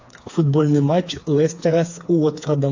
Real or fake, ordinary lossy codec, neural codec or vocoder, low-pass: fake; AAC, 48 kbps; codec, 16 kHz, 4 kbps, FreqCodec, smaller model; 7.2 kHz